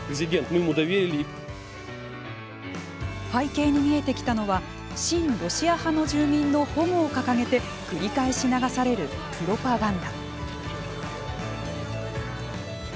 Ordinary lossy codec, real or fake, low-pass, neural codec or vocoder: none; real; none; none